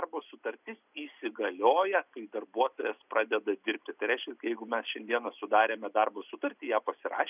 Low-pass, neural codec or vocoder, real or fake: 3.6 kHz; none; real